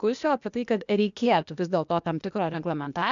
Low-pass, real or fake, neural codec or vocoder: 7.2 kHz; fake; codec, 16 kHz, 0.8 kbps, ZipCodec